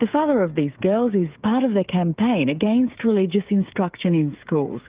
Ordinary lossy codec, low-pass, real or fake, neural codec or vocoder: Opus, 24 kbps; 3.6 kHz; fake; codec, 16 kHz, 8 kbps, FreqCodec, smaller model